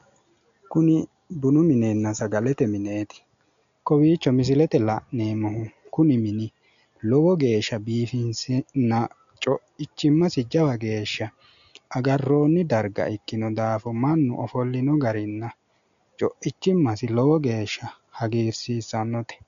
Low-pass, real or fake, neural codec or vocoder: 7.2 kHz; real; none